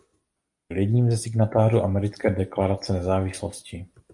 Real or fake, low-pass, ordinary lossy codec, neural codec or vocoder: real; 10.8 kHz; MP3, 64 kbps; none